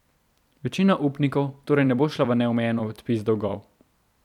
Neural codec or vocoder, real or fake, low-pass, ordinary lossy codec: vocoder, 44.1 kHz, 128 mel bands every 256 samples, BigVGAN v2; fake; 19.8 kHz; none